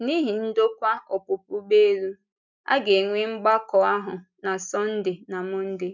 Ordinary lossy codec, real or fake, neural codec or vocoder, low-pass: none; real; none; 7.2 kHz